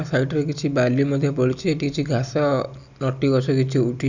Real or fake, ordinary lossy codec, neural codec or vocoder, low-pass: real; none; none; 7.2 kHz